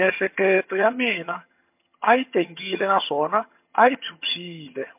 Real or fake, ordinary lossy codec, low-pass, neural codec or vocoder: fake; MP3, 32 kbps; 3.6 kHz; vocoder, 22.05 kHz, 80 mel bands, HiFi-GAN